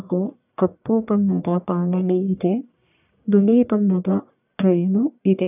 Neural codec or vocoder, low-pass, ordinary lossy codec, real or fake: codec, 44.1 kHz, 1.7 kbps, Pupu-Codec; 3.6 kHz; none; fake